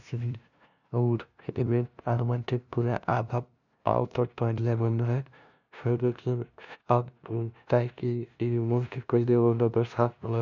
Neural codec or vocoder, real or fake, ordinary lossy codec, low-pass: codec, 16 kHz, 0.5 kbps, FunCodec, trained on LibriTTS, 25 frames a second; fake; none; 7.2 kHz